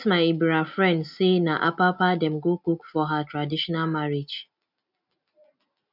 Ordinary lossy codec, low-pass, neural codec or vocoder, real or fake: none; 5.4 kHz; none; real